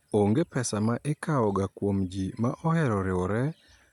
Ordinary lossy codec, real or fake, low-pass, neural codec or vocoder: MP3, 96 kbps; real; 19.8 kHz; none